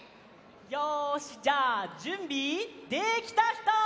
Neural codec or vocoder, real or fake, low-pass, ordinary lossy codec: none; real; none; none